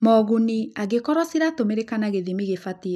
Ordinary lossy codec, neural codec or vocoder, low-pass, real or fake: none; none; 14.4 kHz; real